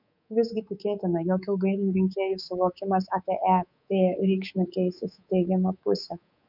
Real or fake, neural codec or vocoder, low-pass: fake; codec, 24 kHz, 3.1 kbps, DualCodec; 5.4 kHz